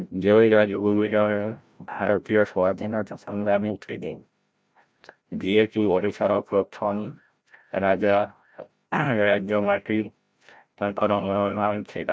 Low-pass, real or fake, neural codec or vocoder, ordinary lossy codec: none; fake; codec, 16 kHz, 0.5 kbps, FreqCodec, larger model; none